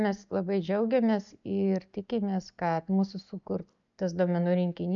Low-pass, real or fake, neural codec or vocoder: 7.2 kHz; real; none